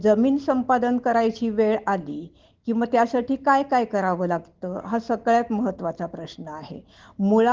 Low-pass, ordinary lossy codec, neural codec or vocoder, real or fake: 7.2 kHz; Opus, 16 kbps; none; real